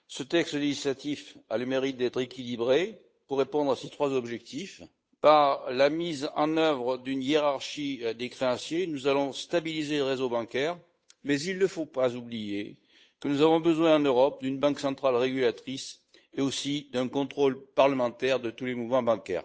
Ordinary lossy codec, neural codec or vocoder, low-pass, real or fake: none; codec, 16 kHz, 8 kbps, FunCodec, trained on Chinese and English, 25 frames a second; none; fake